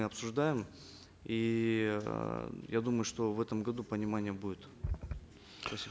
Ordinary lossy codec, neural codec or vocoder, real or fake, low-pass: none; none; real; none